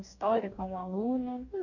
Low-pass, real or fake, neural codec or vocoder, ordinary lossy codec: 7.2 kHz; fake; codec, 44.1 kHz, 2.6 kbps, DAC; none